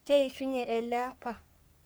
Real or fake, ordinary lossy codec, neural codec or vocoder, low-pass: fake; none; codec, 44.1 kHz, 3.4 kbps, Pupu-Codec; none